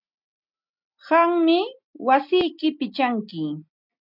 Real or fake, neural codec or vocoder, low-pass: real; none; 5.4 kHz